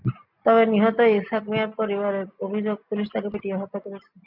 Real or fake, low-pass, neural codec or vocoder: real; 5.4 kHz; none